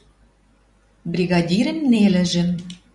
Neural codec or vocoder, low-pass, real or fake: vocoder, 44.1 kHz, 128 mel bands every 256 samples, BigVGAN v2; 10.8 kHz; fake